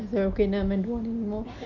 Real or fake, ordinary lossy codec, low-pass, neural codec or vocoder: real; none; 7.2 kHz; none